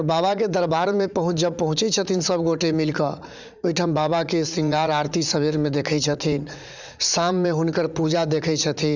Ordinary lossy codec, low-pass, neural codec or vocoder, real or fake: none; 7.2 kHz; vocoder, 44.1 kHz, 80 mel bands, Vocos; fake